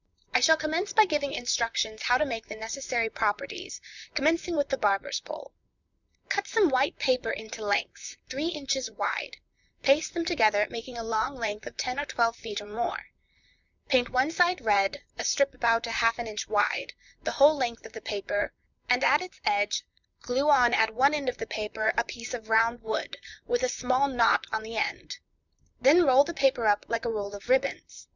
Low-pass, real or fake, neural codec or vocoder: 7.2 kHz; fake; vocoder, 44.1 kHz, 128 mel bands, Pupu-Vocoder